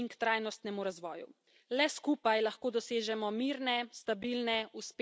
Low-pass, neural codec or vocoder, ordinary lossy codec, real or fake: none; none; none; real